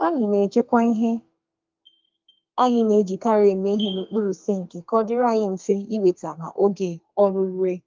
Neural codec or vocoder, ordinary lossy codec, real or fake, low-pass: codec, 32 kHz, 1.9 kbps, SNAC; Opus, 24 kbps; fake; 7.2 kHz